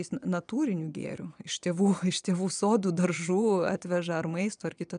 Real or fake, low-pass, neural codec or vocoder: fake; 9.9 kHz; vocoder, 22.05 kHz, 80 mel bands, Vocos